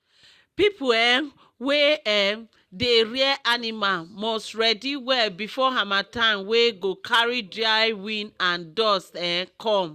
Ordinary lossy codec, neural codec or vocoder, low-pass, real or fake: Opus, 64 kbps; none; 14.4 kHz; real